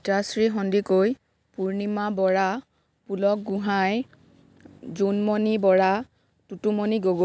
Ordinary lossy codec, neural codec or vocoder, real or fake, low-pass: none; none; real; none